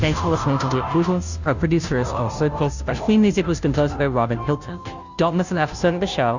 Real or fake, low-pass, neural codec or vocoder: fake; 7.2 kHz; codec, 16 kHz, 0.5 kbps, FunCodec, trained on Chinese and English, 25 frames a second